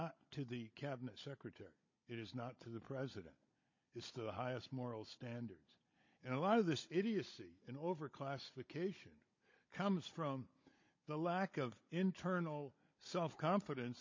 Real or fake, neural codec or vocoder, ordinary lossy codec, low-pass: fake; codec, 16 kHz, 16 kbps, FunCodec, trained on Chinese and English, 50 frames a second; MP3, 32 kbps; 7.2 kHz